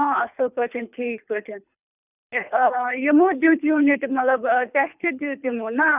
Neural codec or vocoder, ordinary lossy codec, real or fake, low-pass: codec, 24 kHz, 3 kbps, HILCodec; none; fake; 3.6 kHz